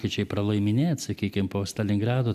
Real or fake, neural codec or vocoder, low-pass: real; none; 14.4 kHz